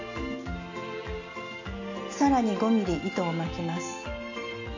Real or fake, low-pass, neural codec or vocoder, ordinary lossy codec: real; 7.2 kHz; none; AAC, 48 kbps